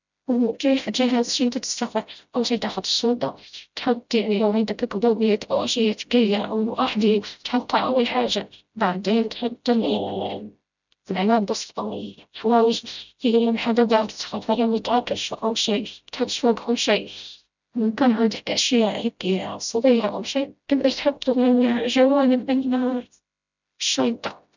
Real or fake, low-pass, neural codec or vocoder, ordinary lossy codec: fake; 7.2 kHz; codec, 16 kHz, 0.5 kbps, FreqCodec, smaller model; none